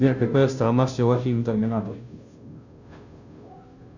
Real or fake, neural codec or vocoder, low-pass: fake; codec, 16 kHz, 0.5 kbps, FunCodec, trained on Chinese and English, 25 frames a second; 7.2 kHz